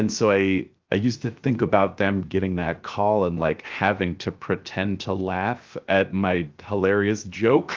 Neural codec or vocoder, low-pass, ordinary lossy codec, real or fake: codec, 16 kHz, 0.7 kbps, FocalCodec; 7.2 kHz; Opus, 24 kbps; fake